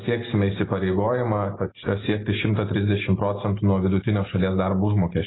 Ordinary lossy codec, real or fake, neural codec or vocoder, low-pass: AAC, 16 kbps; real; none; 7.2 kHz